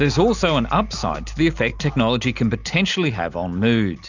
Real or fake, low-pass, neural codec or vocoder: real; 7.2 kHz; none